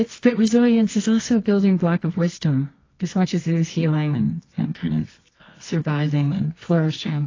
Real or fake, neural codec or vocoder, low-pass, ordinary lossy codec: fake; codec, 24 kHz, 0.9 kbps, WavTokenizer, medium music audio release; 7.2 kHz; AAC, 32 kbps